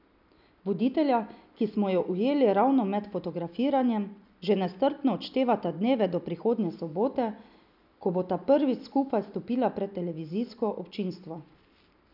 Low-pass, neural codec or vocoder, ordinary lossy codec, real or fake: 5.4 kHz; none; none; real